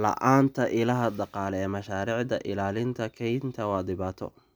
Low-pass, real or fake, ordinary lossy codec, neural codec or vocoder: none; real; none; none